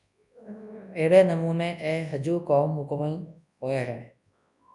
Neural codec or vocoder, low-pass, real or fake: codec, 24 kHz, 0.9 kbps, WavTokenizer, large speech release; 10.8 kHz; fake